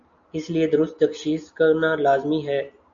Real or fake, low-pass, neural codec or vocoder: real; 7.2 kHz; none